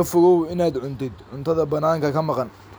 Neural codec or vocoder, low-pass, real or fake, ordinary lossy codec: none; none; real; none